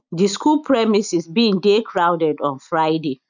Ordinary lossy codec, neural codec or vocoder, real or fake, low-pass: none; none; real; 7.2 kHz